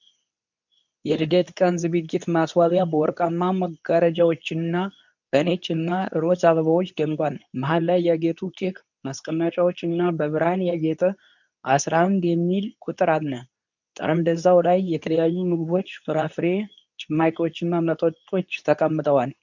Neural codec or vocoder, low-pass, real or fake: codec, 24 kHz, 0.9 kbps, WavTokenizer, medium speech release version 2; 7.2 kHz; fake